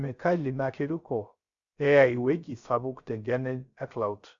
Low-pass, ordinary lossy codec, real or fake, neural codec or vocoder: 7.2 kHz; Opus, 64 kbps; fake; codec, 16 kHz, 0.3 kbps, FocalCodec